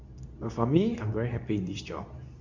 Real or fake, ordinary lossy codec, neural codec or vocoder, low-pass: fake; none; codec, 24 kHz, 0.9 kbps, WavTokenizer, medium speech release version 2; 7.2 kHz